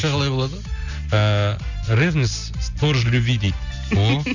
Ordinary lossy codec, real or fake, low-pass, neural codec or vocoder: none; real; 7.2 kHz; none